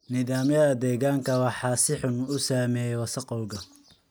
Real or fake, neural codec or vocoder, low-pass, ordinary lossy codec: real; none; none; none